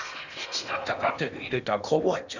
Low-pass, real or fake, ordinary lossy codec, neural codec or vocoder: 7.2 kHz; fake; none; codec, 16 kHz in and 24 kHz out, 0.8 kbps, FocalCodec, streaming, 65536 codes